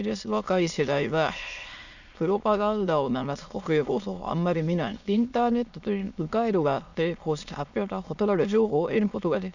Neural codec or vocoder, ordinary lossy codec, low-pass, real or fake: autoencoder, 22.05 kHz, a latent of 192 numbers a frame, VITS, trained on many speakers; none; 7.2 kHz; fake